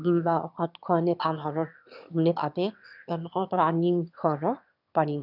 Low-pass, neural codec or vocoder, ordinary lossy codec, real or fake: 5.4 kHz; autoencoder, 22.05 kHz, a latent of 192 numbers a frame, VITS, trained on one speaker; none; fake